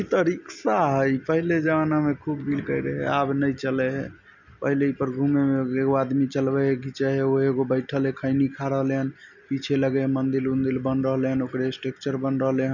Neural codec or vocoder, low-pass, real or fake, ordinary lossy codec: none; 7.2 kHz; real; none